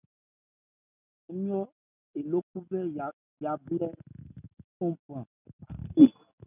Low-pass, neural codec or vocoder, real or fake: 3.6 kHz; none; real